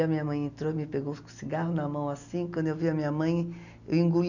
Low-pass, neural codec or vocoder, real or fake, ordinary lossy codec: 7.2 kHz; none; real; none